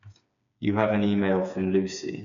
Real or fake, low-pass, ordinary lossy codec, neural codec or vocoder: fake; 7.2 kHz; none; codec, 16 kHz, 4 kbps, FreqCodec, smaller model